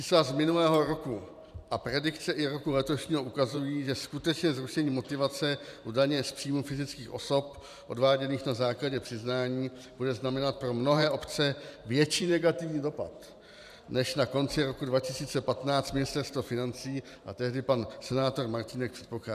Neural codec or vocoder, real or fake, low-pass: vocoder, 44.1 kHz, 128 mel bands every 512 samples, BigVGAN v2; fake; 14.4 kHz